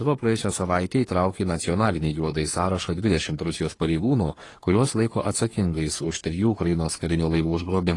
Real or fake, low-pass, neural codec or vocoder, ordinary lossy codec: fake; 10.8 kHz; codec, 44.1 kHz, 3.4 kbps, Pupu-Codec; AAC, 32 kbps